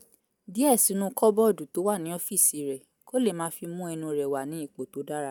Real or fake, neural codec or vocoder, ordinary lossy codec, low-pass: real; none; none; none